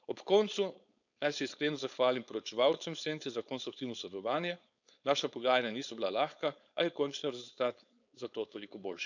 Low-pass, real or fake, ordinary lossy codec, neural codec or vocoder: 7.2 kHz; fake; none; codec, 16 kHz, 4.8 kbps, FACodec